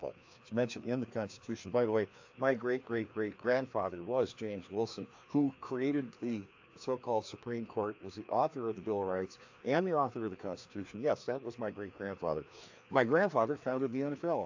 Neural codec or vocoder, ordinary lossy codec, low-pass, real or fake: codec, 16 kHz, 2 kbps, FreqCodec, larger model; AAC, 48 kbps; 7.2 kHz; fake